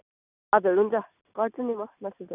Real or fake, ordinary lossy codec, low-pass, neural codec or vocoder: real; none; 3.6 kHz; none